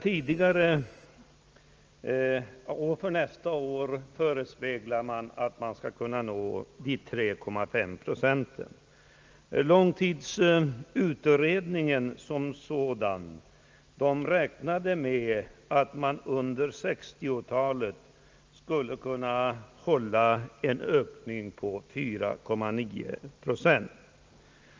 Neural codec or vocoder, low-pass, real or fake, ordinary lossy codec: none; 7.2 kHz; real; Opus, 24 kbps